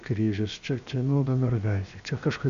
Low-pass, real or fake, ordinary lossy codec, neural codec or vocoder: 7.2 kHz; fake; Opus, 64 kbps; codec, 16 kHz, 0.7 kbps, FocalCodec